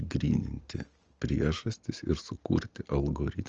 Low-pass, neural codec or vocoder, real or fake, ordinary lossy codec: 7.2 kHz; none; real; Opus, 24 kbps